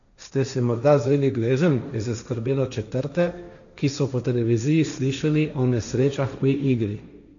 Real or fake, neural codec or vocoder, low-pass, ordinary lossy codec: fake; codec, 16 kHz, 1.1 kbps, Voila-Tokenizer; 7.2 kHz; none